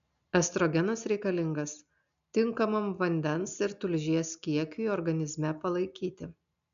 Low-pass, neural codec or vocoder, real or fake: 7.2 kHz; none; real